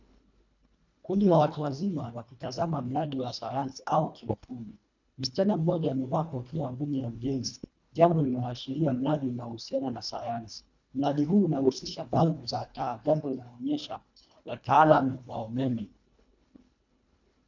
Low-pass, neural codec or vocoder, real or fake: 7.2 kHz; codec, 24 kHz, 1.5 kbps, HILCodec; fake